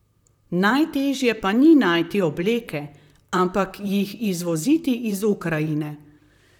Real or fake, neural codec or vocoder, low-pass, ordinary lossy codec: fake; vocoder, 44.1 kHz, 128 mel bands, Pupu-Vocoder; 19.8 kHz; none